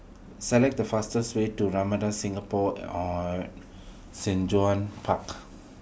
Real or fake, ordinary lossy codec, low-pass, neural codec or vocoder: real; none; none; none